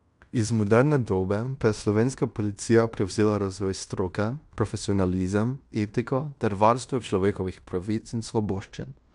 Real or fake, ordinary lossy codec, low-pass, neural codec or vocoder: fake; none; 10.8 kHz; codec, 16 kHz in and 24 kHz out, 0.9 kbps, LongCat-Audio-Codec, fine tuned four codebook decoder